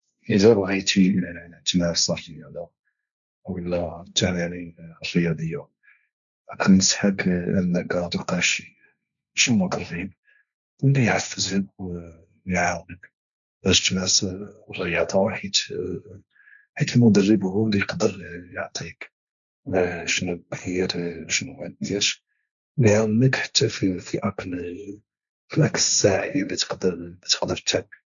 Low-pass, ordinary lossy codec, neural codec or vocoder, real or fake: 7.2 kHz; none; codec, 16 kHz, 1.1 kbps, Voila-Tokenizer; fake